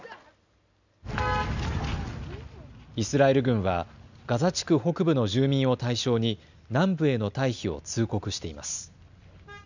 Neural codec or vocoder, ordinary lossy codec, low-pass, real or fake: none; none; 7.2 kHz; real